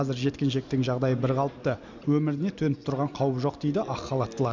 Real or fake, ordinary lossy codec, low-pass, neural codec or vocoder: real; none; 7.2 kHz; none